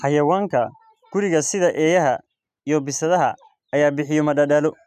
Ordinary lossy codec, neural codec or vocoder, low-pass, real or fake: none; none; 14.4 kHz; real